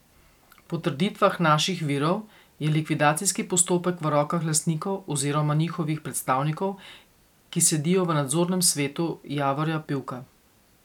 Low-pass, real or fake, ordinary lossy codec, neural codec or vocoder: 19.8 kHz; real; none; none